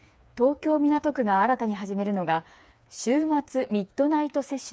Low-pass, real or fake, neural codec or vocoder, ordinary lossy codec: none; fake; codec, 16 kHz, 4 kbps, FreqCodec, smaller model; none